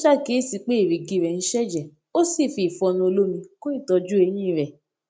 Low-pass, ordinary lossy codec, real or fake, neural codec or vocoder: none; none; real; none